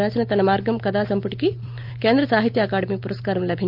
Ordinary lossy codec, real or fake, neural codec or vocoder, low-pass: Opus, 24 kbps; real; none; 5.4 kHz